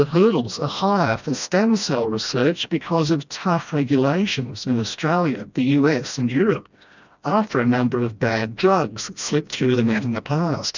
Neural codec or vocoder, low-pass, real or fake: codec, 16 kHz, 1 kbps, FreqCodec, smaller model; 7.2 kHz; fake